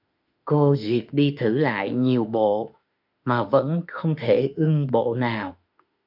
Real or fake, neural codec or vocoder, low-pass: fake; autoencoder, 48 kHz, 32 numbers a frame, DAC-VAE, trained on Japanese speech; 5.4 kHz